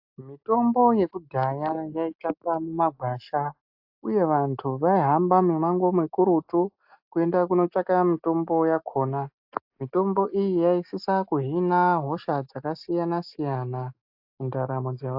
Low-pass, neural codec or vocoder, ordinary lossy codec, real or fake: 5.4 kHz; none; AAC, 48 kbps; real